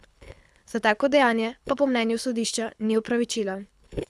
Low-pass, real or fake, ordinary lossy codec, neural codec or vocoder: none; fake; none; codec, 24 kHz, 6 kbps, HILCodec